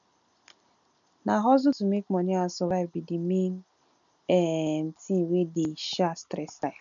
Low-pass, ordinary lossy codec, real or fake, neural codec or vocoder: 7.2 kHz; none; real; none